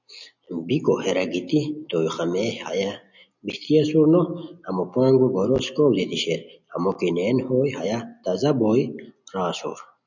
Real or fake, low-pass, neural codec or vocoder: real; 7.2 kHz; none